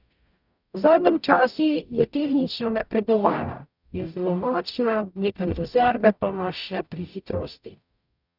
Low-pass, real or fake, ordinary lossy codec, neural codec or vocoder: 5.4 kHz; fake; none; codec, 44.1 kHz, 0.9 kbps, DAC